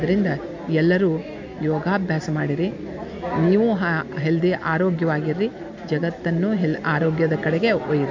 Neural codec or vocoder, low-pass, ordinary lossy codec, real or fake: none; 7.2 kHz; MP3, 48 kbps; real